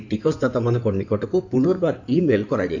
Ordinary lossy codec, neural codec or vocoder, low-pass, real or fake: none; codec, 16 kHz in and 24 kHz out, 2.2 kbps, FireRedTTS-2 codec; 7.2 kHz; fake